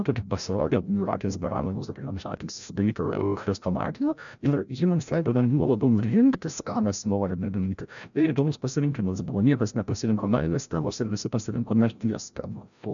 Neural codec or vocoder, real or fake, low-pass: codec, 16 kHz, 0.5 kbps, FreqCodec, larger model; fake; 7.2 kHz